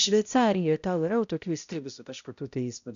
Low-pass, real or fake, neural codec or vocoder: 7.2 kHz; fake; codec, 16 kHz, 0.5 kbps, X-Codec, HuBERT features, trained on balanced general audio